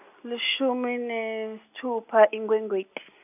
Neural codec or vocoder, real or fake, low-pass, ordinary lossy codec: none; real; 3.6 kHz; none